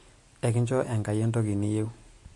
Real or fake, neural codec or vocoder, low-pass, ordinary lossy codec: real; none; 10.8 kHz; MP3, 48 kbps